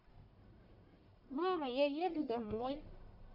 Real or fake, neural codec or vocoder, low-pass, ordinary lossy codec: fake; codec, 44.1 kHz, 1.7 kbps, Pupu-Codec; 5.4 kHz; none